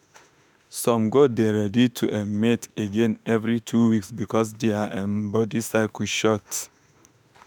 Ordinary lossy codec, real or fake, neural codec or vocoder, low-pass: none; fake; autoencoder, 48 kHz, 32 numbers a frame, DAC-VAE, trained on Japanese speech; none